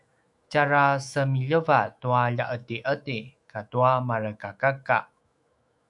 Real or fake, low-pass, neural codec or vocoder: fake; 10.8 kHz; autoencoder, 48 kHz, 128 numbers a frame, DAC-VAE, trained on Japanese speech